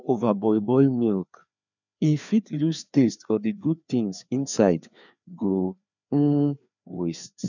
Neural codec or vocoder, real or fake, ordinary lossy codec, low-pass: codec, 16 kHz, 2 kbps, FreqCodec, larger model; fake; none; 7.2 kHz